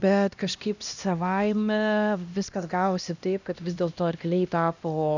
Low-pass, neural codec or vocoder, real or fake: 7.2 kHz; codec, 16 kHz, 1 kbps, X-Codec, HuBERT features, trained on LibriSpeech; fake